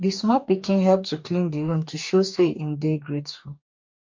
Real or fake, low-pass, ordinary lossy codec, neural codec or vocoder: fake; 7.2 kHz; MP3, 48 kbps; codec, 44.1 kHz, 2.6 kbps, DAC